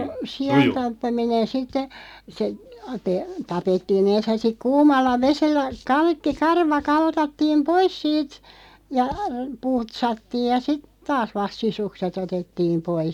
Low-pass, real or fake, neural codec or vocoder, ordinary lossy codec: 19.8 kHz; real; none; none